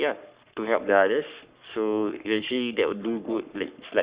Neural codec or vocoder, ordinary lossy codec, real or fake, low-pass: codec, 44.1 kHz, 3.4 kbps, Pupu-Codec; Opus, 64 kbps; fake; 3.6 kHz